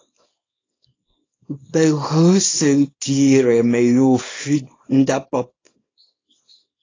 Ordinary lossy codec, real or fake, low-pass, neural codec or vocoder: AAC, 32 kbps; fake; 7.2 kHz; codec, 24 kHz, 0.9 kbps, WavTokenizer, small release